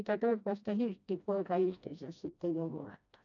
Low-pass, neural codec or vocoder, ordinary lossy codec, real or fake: 7.2 kHz; codec, 16 kHz, 1 kbps, FreqCodec, smaller model; none; fake